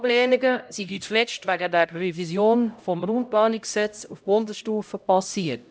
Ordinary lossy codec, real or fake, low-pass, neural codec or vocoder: none; fake; none; codec, 16 kHz, 0.5 kbps, X-Codec, HuBERT features, trained on LibriSpeech